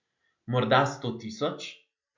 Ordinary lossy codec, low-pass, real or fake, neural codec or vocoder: MP3, 64 kbps; 7.2 kHz; real; none